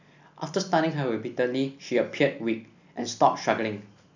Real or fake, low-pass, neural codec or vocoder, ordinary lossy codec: real; 7.2 kHz; none; none